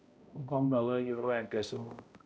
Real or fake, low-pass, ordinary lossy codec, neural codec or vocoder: fake; none; none; codec, 16 kHz, 0.5 kbps, X-Codec, HuBERT features, trained on balanced general audio